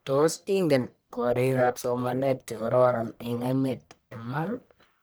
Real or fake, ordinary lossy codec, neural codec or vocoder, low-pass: fake; none; codec, 44.1 kHz, 1.7 kbps, Pupu-Codec; none